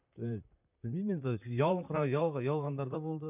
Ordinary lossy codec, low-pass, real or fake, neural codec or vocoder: none; 3.6 kHz; fake; vocoder, 44.1 kHz, 128 mel bands, Pupu-Vocoder